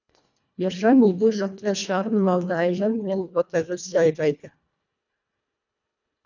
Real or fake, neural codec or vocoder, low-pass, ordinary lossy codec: fake; codec, 24 kHz, 1.5 kbps, HILCodec; 7.2 kHz; none